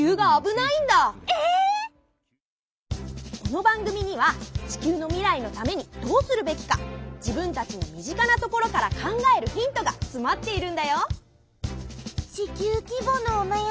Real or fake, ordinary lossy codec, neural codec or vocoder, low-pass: real; none; none; none